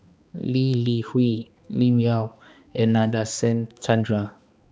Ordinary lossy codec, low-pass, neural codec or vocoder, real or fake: none; none; codec, 16 kHz, 2 kbps, X-Codec, HuBERT features, trained on balanced general audio; fake